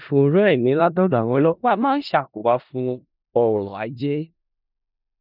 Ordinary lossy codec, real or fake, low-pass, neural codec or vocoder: none; fake; 5.4 kHz; codec, 16 kHz in and 24 kHz out, 0.4 kbps, LongCat-Audio-Codec, four codebook decoder